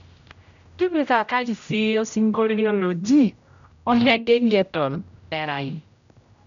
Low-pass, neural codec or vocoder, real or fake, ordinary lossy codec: 7.2 kHz; codec, 16 kHz, 0.5 kbps, X-Codec, HuBERT features, trained on general audio; fake; none